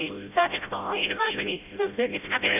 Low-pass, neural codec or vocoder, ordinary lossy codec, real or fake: 3.6 kHz; codec, 16 kHz, 0.5 kbps, FreqCodec, smaller model; none; fake